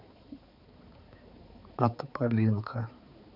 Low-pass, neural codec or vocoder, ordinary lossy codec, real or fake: 5.4 kHz; codec, 16 kHz, 4 kbps, X-Codec, HuBERT features, trained on balanced general audio; none; fake